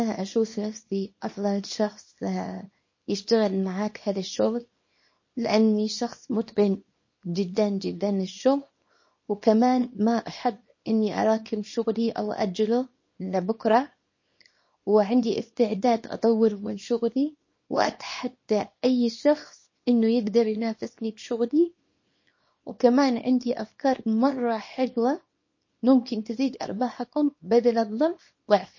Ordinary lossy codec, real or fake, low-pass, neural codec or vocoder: MP3, 32 kbps; fake; 7.2 kHz; codec, 24 kHz, 0.9 kbps, WavTokenizer, small release